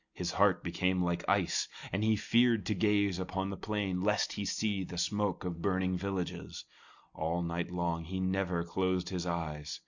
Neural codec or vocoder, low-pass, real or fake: none; 7.2 kHz; real